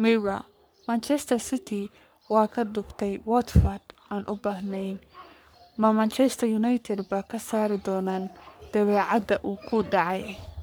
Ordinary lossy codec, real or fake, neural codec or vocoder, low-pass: none; fake; codec, 44.1 kHz, 3.4 kbps, Pupu-Codec; none